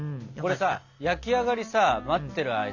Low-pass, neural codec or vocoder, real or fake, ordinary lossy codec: 7.2 kHz; none; real; none